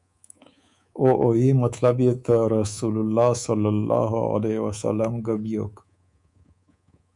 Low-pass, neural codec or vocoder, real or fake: 10.8 kHz; codec, 24 kHz, 3.1 kbps, DualCodec; fake